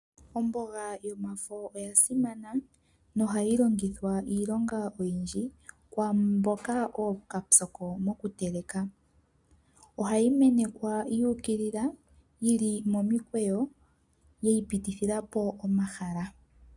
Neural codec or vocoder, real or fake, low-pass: none; real; 10.8 kHz